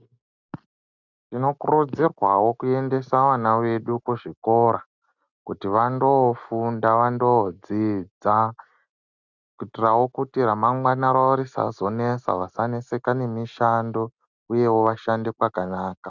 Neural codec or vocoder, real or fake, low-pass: none; real; 7.2 kHz